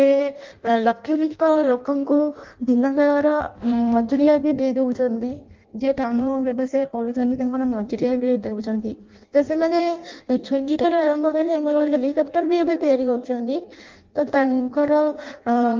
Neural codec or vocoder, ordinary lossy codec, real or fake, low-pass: codec, 16 kHz in and 24 kHz out, 0.6 kbps, FireRedTTS-2 codec; Opus, 24 kbps; fake; 7.2 kHz